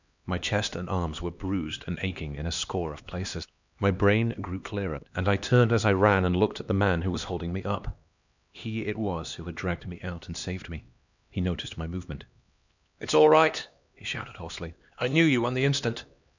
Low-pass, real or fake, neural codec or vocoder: 7.2 kHz; fake; codec, 16 kHz, 2 kbps, X-Codec, HuBERT features, trained on LibriSpeech